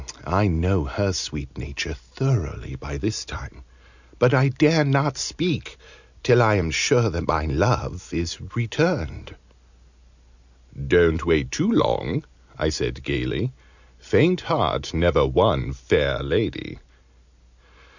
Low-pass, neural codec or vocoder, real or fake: 7.2 kHz; none; real